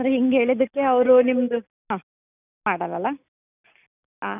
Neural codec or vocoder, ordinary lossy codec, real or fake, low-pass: none; none; real; 3.6 kHz